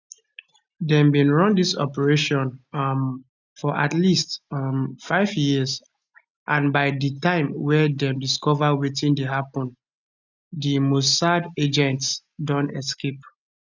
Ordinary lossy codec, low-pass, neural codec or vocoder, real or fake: none; 7.2 kHz; none; real